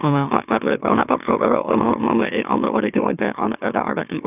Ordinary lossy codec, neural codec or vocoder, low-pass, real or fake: none; autoencoder, 44.1 kHz, a latent of 192 numbers a frame, MeloTTS; 3.6 kHz; fake